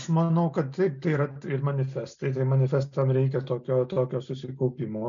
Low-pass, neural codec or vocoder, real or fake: 7.2 kHz; none; real